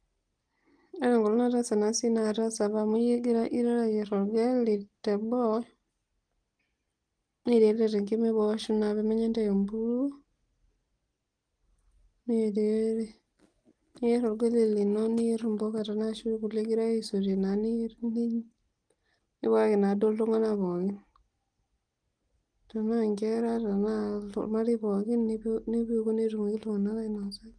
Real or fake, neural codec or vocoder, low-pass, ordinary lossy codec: real; none; 9.9 kHz; Opus, 24 kbps